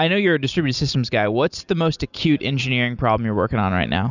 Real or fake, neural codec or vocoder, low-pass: real; none; 7.2 kHz